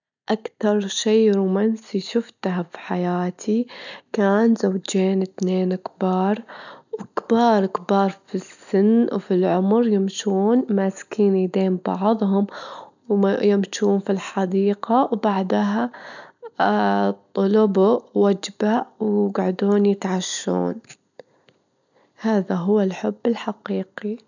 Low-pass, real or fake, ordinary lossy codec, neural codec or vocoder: 7.2 kHz; real; none; none